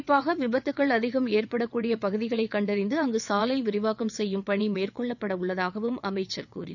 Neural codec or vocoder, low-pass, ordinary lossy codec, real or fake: vocoder, 22.05 kHz, 80 mel bands, WaveNeXt; 7.2 kHz; none; fake